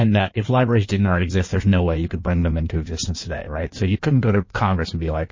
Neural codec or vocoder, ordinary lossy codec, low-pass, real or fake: codec, 16 kHz in and 24 kHz out, 1.1 kbps, FireRedTTS-2 codec; MP3, 32 kbps; 7.2 kHz; fake